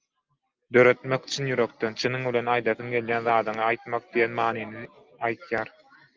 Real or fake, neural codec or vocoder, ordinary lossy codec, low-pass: real; none; Opus, 24 kbps; 7.2 kHz